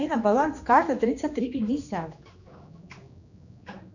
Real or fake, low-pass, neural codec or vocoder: fake; 7.2 kHz; codec, 16 kHz, 2 kbps, X-Codec, HuBERT features, trained on general audio